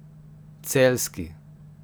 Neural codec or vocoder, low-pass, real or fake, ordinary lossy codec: none; none; real; none